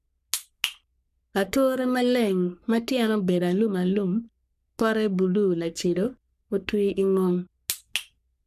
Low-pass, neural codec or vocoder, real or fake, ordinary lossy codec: 14.4 kHz; codec, 44.1 kHz, 3.4 kbps, Pupu-Codec; fake; none